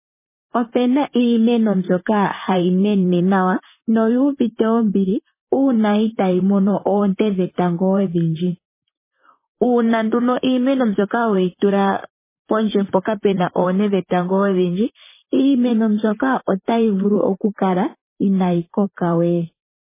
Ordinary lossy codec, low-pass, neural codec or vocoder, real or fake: MP3, 16 kbps; 3.6 kHz; vocoder, 44.1 kHz, 128 mel bands, Pupu-Vocoder; fake